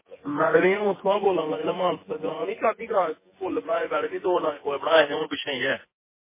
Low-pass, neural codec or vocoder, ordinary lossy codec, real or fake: 3.6 kHz; vocoder, 24 kHz, 100 mel bands, Vocos; MP3, 16 kbps; fake